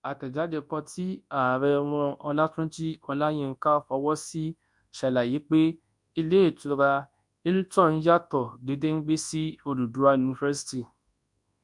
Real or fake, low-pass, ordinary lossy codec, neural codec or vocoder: fake; 10.8 kHz; MP3, 64 kbps; codec, 24 kHz, 0.9 kbps, WavTokenizer, large speech release